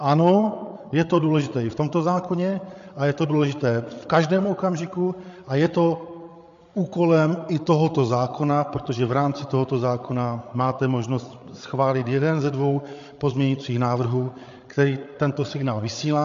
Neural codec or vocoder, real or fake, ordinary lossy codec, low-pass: codec, 16 kHz, 8 kbps, FreqCodec, larger model; fake; MP3, 48 kbps; 7.2 kHz